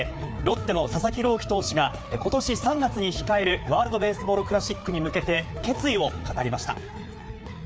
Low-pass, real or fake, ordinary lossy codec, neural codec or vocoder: none; fake; none; codec, 16 kHz, 4 kbps, FreqCodec, larger model